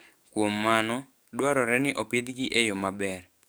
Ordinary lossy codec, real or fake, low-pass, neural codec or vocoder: none; fake; none; codec, 44.1 kHz, 7.8 kbps, DAC